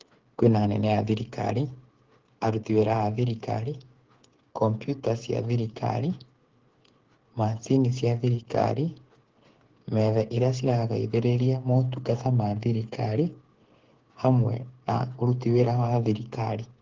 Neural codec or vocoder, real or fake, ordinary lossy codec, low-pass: codec, 16 kHz, 8 kbps, FreqCodec, smaller model; fake; Opus, 16 kbps; 7.2 kHz